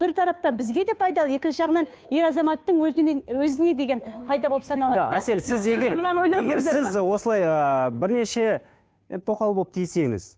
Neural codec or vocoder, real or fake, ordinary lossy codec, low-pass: codec, 16 kHz, 2 kbps, FunCodec, trained on Chinese and English, 25 frames a second; fake; none; none